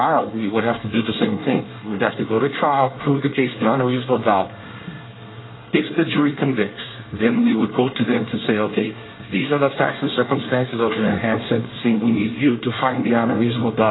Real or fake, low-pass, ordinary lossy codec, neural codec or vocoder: fake; 7.2 kHz; AAC, 16 kbps; codec, 24 kHz, 1 kbps, SNAC